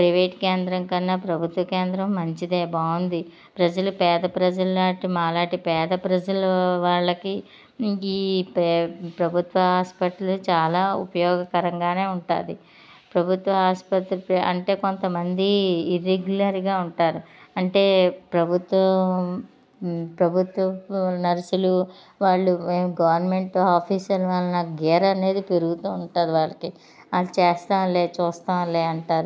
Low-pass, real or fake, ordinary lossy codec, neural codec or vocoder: none; real; none; none